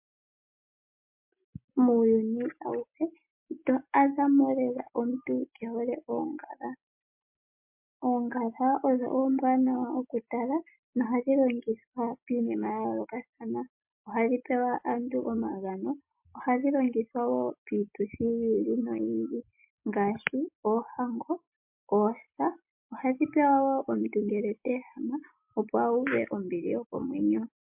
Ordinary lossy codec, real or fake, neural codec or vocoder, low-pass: MP3, 32 kbps; real; none; 3.6 kHz